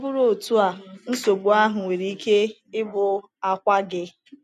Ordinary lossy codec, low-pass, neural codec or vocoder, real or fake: none; 14.4 kHz; none; real